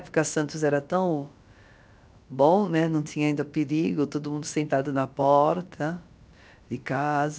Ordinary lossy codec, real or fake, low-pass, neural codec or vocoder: none; fake; none; codec, 16 kHz, about 1 kbps, DyCAST, with the encoder's durations